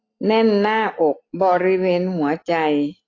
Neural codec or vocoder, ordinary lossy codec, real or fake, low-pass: none; AAC, 32 kbps; real; 7.2 kHz